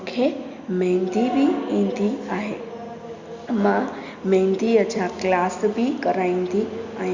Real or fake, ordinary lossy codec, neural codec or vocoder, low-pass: real; Opus, 64 kbps; none; 7.2 kHz